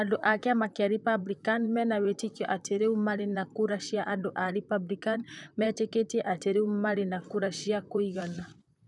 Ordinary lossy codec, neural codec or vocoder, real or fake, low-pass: none; vocoder, 44.1 kHz, 128 mel bands, Pupu-Vocoder; fake; 10.8 kHz